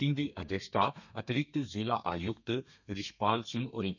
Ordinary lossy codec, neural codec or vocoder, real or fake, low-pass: none; codec, 32 kHz, 1.9 kbps, SNAC; fake; 7.2 kHz